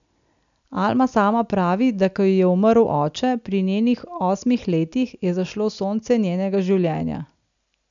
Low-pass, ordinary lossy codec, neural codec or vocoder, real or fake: 7.2 kHz; none; none; real